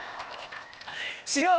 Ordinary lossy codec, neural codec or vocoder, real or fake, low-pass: none; codec, 16 kHz, 0.8 kbps, ZipCodec; fake; none